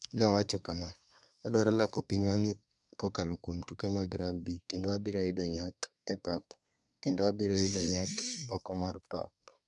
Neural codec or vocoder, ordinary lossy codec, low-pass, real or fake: codec, 24 kHz, 1 kbps, SNAC; none; 10.8 kHz; fake